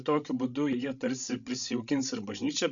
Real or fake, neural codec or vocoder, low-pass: fake; codec, 16 kHz, 16 kbps, FreqCodec, larger model; 7.2 kHz